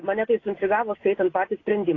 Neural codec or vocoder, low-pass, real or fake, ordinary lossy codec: none; 7.2 kHz; real; AAC, 32 kbps